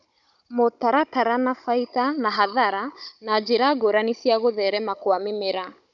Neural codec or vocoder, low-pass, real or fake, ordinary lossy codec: codec, 16 kHz, 16 kbps, FunCodec, trained on Chinese and English, 50 frames a second; 7.2 kHz; fake; none